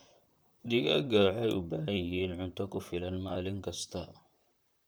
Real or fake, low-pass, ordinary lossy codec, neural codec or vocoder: fake; none; none; vocoder, 44.1 kHz, 128 mel bands, Pupu-Vocoder